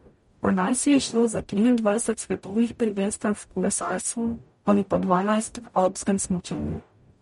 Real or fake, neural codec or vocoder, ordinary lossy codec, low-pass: fake; codec, 44.1 kHz, 0.9 kbps, DAC; MP3, 48 kbps; 19.8 kHz